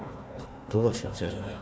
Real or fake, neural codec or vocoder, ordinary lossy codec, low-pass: fake; codec, 16 kHz, 1 kbps, FunCodec, trained on Chinese and English, 50 frames a second; none; none